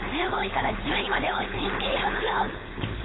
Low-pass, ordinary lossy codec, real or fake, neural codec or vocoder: 7.2 kHz; AAC, 16 kbps; fake; codec, 16 kHz, 4.8 kbps, FACodec